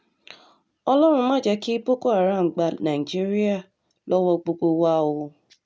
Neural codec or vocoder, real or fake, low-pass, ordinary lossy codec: none; real; none; none